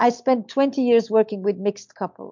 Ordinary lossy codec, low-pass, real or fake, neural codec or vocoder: MP3, 64 kbps; 7.2 kHz; real; none